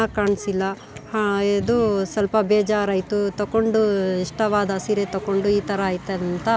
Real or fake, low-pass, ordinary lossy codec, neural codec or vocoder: real; none; none; none